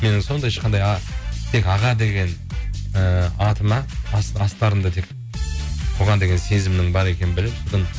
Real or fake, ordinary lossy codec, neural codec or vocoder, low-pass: real; none; none; none